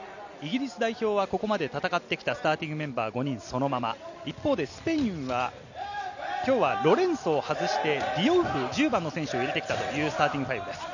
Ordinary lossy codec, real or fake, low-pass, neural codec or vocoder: none; real; 7.2 kHz; none